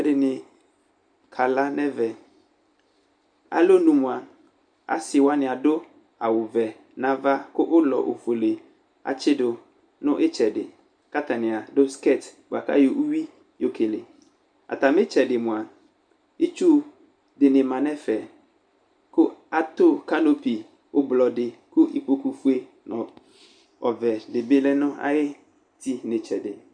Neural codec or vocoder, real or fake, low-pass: none; real; 9.9 kHz